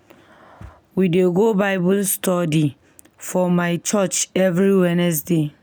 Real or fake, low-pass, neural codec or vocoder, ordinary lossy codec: real; none; none; none